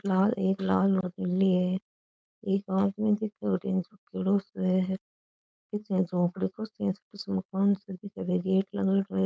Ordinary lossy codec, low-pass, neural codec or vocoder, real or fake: none; none; codec, 16 kHz, 4.8 kbps, FACodec; fake